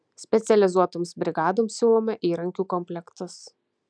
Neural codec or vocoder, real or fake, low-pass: none; real; 9.9 kHz